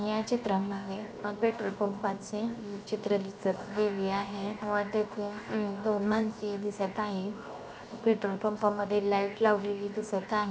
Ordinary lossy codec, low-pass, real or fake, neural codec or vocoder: none; none; fake; codec, 16 kHz, 0.7 kbps, FocalCodec